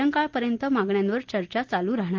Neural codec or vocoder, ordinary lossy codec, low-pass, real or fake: none; Opus, 16 kbps; 7.2 kHz; real